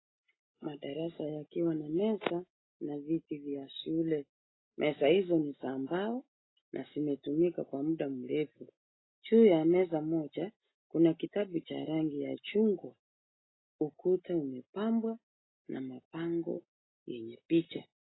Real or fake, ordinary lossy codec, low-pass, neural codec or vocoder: real; AAC, 16 kbps; 7.2 kHz; none